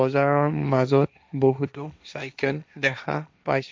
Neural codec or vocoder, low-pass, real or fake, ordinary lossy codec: codec, 16 kHz, 1.1 kbps, Voila-Tokenizer; none; fake; none